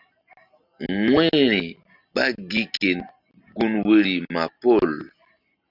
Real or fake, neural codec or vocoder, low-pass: real; none; 5.4 kHz